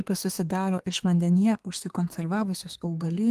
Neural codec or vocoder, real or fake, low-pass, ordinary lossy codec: codec, 32 kHz, 1.9 kbps, SNAC; fake; 14.4 kHz; Opus, 24 kbps